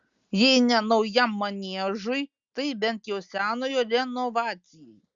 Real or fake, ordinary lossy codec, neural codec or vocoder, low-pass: real; Opus, 64 kbps; none; 7.2 kHz